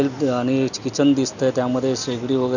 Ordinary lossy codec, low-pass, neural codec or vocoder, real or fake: none; 7.2 kHz; none; real